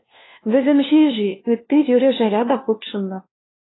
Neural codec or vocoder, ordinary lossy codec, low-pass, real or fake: codec, 16 kHz, 1 kbps, FunCodec, trained on LibriTTS, 50 frames a second; AAC, 16 kbps; 7.2 kHz; fake